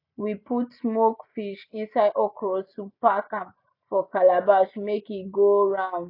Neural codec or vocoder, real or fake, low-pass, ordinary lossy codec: none; real; 5.4 kHz; none